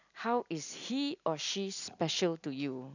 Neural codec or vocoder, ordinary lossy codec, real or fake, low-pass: none; none; real; 7.2 kHz